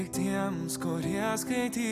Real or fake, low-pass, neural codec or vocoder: real; 14.4 kHz; none